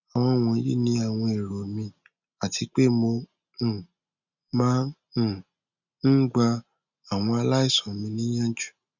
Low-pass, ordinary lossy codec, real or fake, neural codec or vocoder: 7.2 kHz; none; real; none